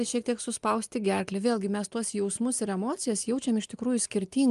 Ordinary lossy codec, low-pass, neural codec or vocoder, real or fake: Opus, 32 kbps; 10.8 kHz; none; real